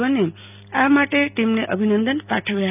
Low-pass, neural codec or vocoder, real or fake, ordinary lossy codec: 3.6 kHz; none; real; none